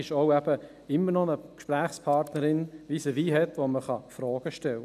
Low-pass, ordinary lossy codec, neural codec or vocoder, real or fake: 14.4 kHz; none; none; real